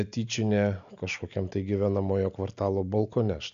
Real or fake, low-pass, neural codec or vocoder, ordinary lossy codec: real; 7.2 kHz; none; MP3, 64 kbps